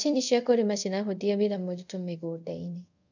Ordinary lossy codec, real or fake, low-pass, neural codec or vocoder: none; fake; 7.2 kHz; codec, 24 kHz, 0.5 kbps, DualCodec